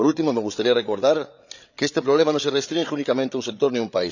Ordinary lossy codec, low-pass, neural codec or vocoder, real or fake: none; 7.2 kHz; codec, 16 kHz, 8 kbps, FreqCodec, larger model; fake